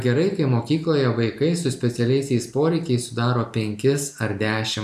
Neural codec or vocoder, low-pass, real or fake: none; 14.4 kHz; real